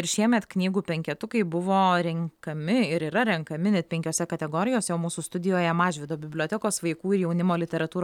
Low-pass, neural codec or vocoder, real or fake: 19.8 kHz; none; real